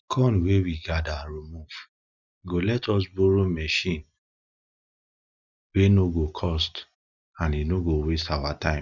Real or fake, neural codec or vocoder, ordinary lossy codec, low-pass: real; none; none; 7.2 kHz